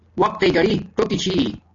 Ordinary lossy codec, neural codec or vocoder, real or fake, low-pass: AAC, 48 kbps; none; real; 7.2 kHz